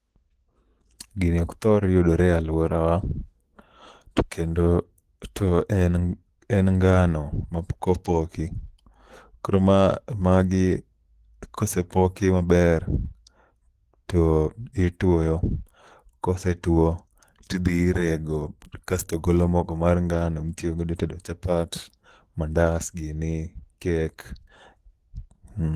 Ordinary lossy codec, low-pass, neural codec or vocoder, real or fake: Opus, 16 kbps; 14.4 kHz; autoencoder, 48 kHz, 128 numbers a frame, DAC-VAE, trained on Japanese speech; fake